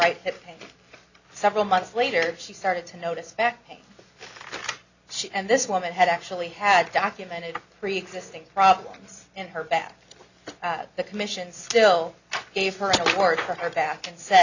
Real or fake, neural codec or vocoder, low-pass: real; none; 7.2 kHz